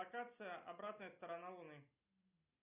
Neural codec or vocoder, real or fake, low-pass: none; real; 3.6 kHz